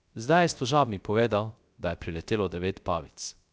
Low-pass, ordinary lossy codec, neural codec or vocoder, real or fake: none; none; codec, 16 kHz, 0.3 kbps, FocalCodec; fake